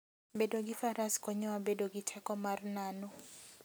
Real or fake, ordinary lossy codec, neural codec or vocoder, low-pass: real; none; none; none